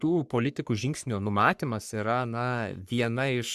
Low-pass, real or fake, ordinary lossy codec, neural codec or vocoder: 14.4 kHz; fake; Opus, 64 kbps; codec, 44.1 kHz, 3.4 kbps, Pupu-Codec